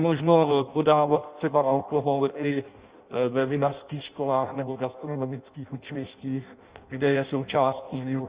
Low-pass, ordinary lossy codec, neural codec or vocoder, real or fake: 3.6 kHz; Opus, 64 kbps; codec, 16 kHz in and 24 kHz out, 0.6 kbps, FireRedTTS-2 codec; fake